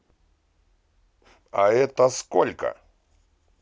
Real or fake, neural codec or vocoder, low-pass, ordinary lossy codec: real; none; none; none